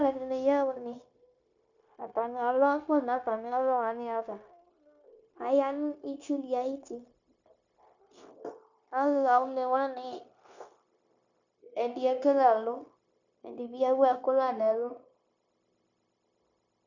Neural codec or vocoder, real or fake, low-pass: codec, 16 kHz, 0.9 kbps, LongCat-Audio-Codec; fake; 7.2 kHz